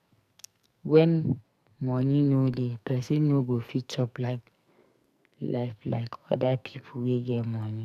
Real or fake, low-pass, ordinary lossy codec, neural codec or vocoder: fake; 14.4 kHz; none; codec, 44.1 kHz, 2.6 kbps, SNAC